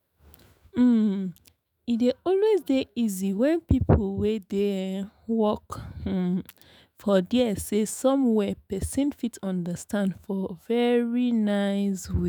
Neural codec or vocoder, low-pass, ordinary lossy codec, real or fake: autoencoder, 48 kHz, 128 numbers a frame, DAC-VAE, trained on Japanese speech; none; none; fake